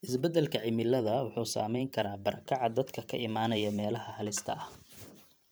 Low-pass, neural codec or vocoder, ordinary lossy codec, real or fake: none; vocoder, 44.1 kHz, 128 mel bands every 512 samples, BigVGAN v2; none; fake